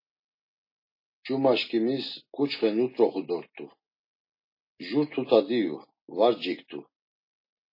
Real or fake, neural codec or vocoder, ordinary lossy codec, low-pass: real; none; MP3, 24 kbps; 5.4 kHz